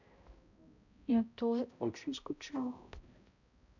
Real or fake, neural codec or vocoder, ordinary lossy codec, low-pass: fake; codec, 16 kHz, 0.5 kbps, X-Codec, HuBERT features, trained on balanced general audio; none; 7.2 kHz